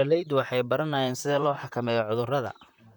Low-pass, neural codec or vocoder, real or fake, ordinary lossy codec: 19.8 kHz; vocoder, 44.1 kHz, 128 mel bands, Pupu-Vocoder; fake; none